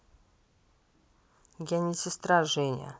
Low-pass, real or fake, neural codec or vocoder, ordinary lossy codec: none; real; none; none